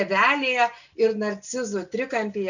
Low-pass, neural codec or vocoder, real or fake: 7.2 kHz; none; real